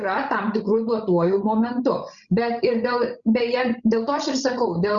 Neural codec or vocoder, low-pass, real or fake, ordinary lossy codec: codec, 16 kHz, 16 kbps, FreqCodec, larger model; 7.2 kHz; fake; Opus, 64 kbps